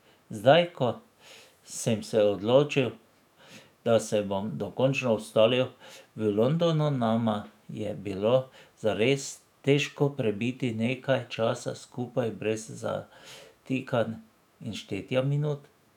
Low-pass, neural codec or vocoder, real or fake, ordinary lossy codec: 19.8 kHz; autoencoder, 48 kHz, 128 numbers a frame, DAC-VAE, trained on Japanese speech; fake; none